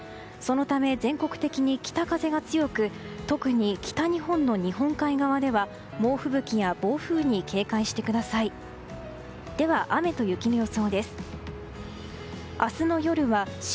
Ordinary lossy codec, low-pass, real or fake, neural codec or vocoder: none; none; real; none